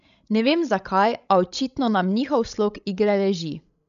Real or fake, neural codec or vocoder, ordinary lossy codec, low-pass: fake; codec, 16 kHz, 16 kbps, FreqCodec, larger model; none; 7.2 kHz